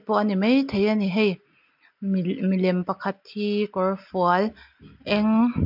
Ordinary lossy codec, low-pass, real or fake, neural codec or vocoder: MP3, 48 kbps; 5.4 kHz; real; none